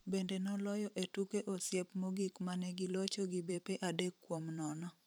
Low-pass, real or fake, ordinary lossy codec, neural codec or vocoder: none; fake; none; vocoder, 44.1 kHz, 128 mel bands every 512 samples, BigVGAN v2